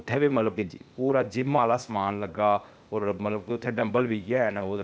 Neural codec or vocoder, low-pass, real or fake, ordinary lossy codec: codec, 16 kHz, 0.8 kbps, ZipCodec; none; fake; none